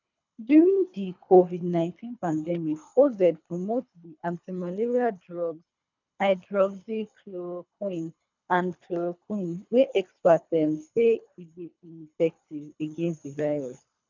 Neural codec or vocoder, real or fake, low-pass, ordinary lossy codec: codec, 24 kHz, 3 kbps, HILCodec; fake; 7.2 kHz; none